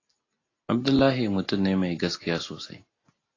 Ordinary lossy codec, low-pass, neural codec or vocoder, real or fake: AAC, 32 kbps; 7.2 kHz; none; real